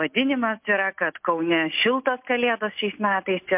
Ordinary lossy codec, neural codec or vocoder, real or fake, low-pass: MP3, 32 kbps; none; real; 3.6 kHz